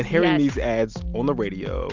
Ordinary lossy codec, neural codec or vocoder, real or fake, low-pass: Opus, 32 kbps; none; real; 7.2 kHz